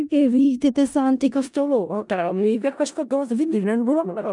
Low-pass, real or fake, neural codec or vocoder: 10.8 kHz; fake; codec, 16 kHz in and 24 kHz out, 0.4 kbps, LongCat-Audio-Codec, four codebook decoder